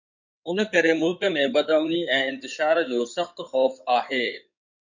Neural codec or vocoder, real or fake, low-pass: codec, 16 kHz in and 24 kHz out, 2.2 kbps, FireRedTTS-2 codec; fake; 7.2 kHz